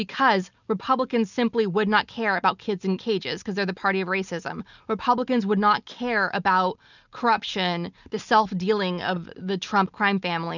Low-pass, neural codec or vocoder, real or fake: 7.2 kHz; none; real